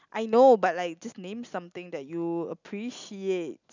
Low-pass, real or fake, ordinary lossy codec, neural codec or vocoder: 7.2 kHz; real; none; none